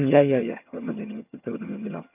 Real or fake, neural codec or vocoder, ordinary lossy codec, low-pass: fake; vocoder, 22.05 kHz, 80 mel bands, HiFi-GAN; none; 3.6 kHz